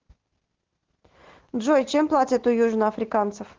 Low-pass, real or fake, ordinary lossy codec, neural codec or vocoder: 7.2 kHz; real; Opus, 16 kbps; none